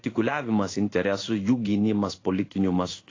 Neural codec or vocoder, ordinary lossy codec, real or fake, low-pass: codec, 16 kHz in and 24 kHz out, 1 kbps, XY-Tokenizer; AAC, 32 kbps; fake; 7.2 kHz